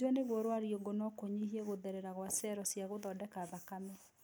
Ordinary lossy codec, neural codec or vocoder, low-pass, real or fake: none; none; none; real